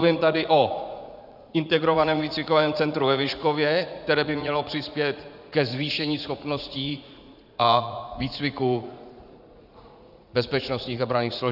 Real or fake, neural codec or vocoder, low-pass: fake; vocoder, 44.1 kHz, 80 mel bands, Vocos; 5.4 kHz